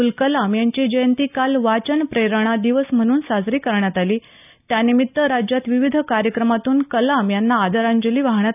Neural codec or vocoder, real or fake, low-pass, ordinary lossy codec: none; real; 3.6 kHz; none